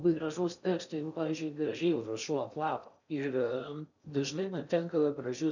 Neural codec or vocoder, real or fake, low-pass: codec, 16 kHz in and 24 kHz out, 0.6 kbps, FocalCodec, streaming, 4096 codes; fake; 7.2 kHz